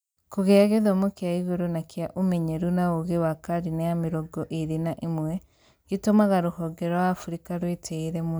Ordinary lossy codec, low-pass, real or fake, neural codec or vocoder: none; none; real; none